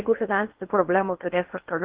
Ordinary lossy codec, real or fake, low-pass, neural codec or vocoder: Opus, 16 kbps; fake; 3.6 kHz; codec, 16 kHz in and 24 kHz out, 0.6 kbps, FocalCodec, streaming, 4096 codes